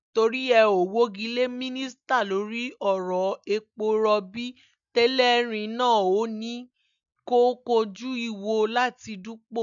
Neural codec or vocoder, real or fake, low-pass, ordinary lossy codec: none; real; 7.2 kHz; none